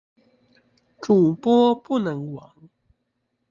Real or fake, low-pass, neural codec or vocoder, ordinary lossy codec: real; 7.2 kHz; none; Opus, 32 kbps